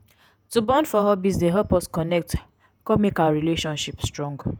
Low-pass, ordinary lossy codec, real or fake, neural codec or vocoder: none; none; fake; vocoder, 48 kHz, 128 mel bands, Vocos